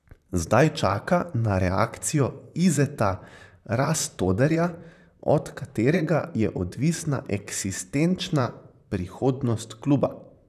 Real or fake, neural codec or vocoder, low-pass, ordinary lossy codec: fake; vocoder, 44.1 kHz, 128 mel bands, Pupu-Vocoder; 14.4 kHz; none